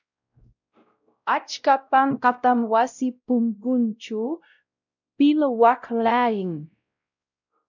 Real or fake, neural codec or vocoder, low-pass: fake; codec, 16 kHz, 0.5 kbps, X-Codec, WavLM features, trained on Multilingual LibriSpeech; 7.2 kHz